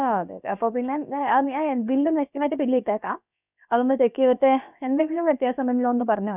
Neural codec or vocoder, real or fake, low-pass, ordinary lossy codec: codec, 16 kHz, about 1 kbps, DyCAST, with the encoder's durations; fake; 3.6 kHz; none